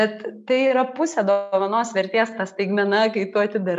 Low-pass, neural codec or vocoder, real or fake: 10.8 kHz; none; real